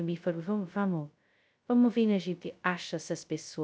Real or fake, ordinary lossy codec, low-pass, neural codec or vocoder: fake; none; none; codec, 16 kHz, 0.2 kbps, FocalCodec